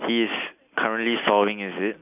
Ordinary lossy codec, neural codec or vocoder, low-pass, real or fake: none; none; 3.6 kHz; real